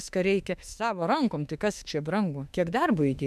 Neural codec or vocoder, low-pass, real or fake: autoencoder, 48 kHz, 32 numbers a frame, DAC-VAE, trained on Japanese speech; 14.4 kHz; fake